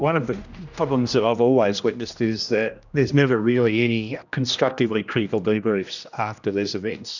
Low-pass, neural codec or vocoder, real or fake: 7.2 kHz; codec, 16 kHz, 1 kbps, X-Codec, HuBERT features, trained on general audio; fake